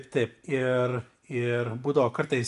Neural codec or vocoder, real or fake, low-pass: none; real; 10.8 kHz